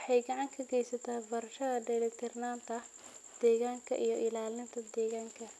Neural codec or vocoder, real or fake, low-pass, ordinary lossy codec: none; real; none; none